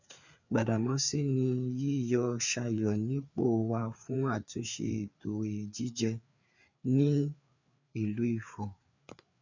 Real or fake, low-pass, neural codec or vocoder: fake; 7.2 kHz; codec, 16 kHz, 4 kbps, FreqCodec, larger model